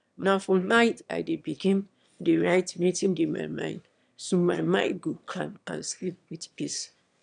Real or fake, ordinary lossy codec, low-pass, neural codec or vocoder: fake; none; 9.9 kHz; autoencoder, 22.05 kHz, a latent of 192 numbers a frame, VITS, trained on one speaker